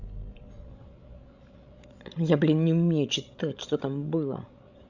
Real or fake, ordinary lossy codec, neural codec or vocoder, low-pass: fake; none; codec, 16 kHz, 16 kbps, FreqCodec, larger model; 7.2 kHz